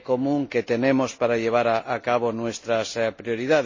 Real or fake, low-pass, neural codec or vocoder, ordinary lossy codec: real; 7.2 kHz; none; MP3, 32 kbps